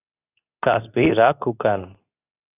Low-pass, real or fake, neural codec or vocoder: 3.6 kHz; fake; codec, 24 kHz, 0.9 kbps, WavTokenizer, medium speech release version 2